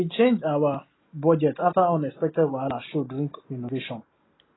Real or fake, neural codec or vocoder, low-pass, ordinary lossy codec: real; none; 7.2 kHz; AAC, 16 kbps